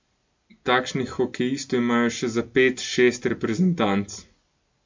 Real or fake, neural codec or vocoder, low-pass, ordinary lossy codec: real; none; 7.2 kHz; MP3, 48 kbps